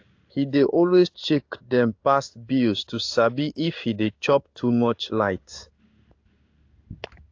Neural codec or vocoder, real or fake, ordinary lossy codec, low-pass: codec, 16 kHz in and 24 kHz out, 1 kbps, XY-Tokenizer; fake; AAC, 48 kbps; 7.2 kHz